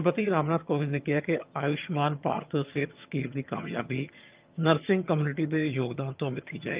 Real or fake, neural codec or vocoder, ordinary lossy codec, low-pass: fake; vocoder, 22.05 kHz, 80 mel bands, HiFi-GAN; Opus, 24 kbps; 3.6 kHz